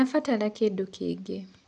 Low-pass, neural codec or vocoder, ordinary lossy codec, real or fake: 9.9 kHz; none; none; real